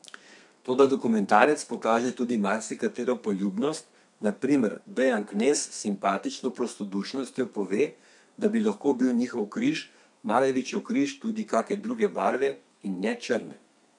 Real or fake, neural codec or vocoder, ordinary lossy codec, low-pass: fake; codec, 32 kHz, 1.9 kbps, SNAC; none; 10.8 kHz